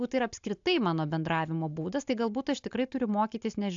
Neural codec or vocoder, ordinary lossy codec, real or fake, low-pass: none; AAC, 64 kbps; real; 7.2 kHz